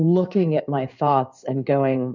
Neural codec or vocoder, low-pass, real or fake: codec, 16 kHz in and 24 kHz out, 2.2 kbps, FireRedTTS-2 codec; 7.2 kHz; fake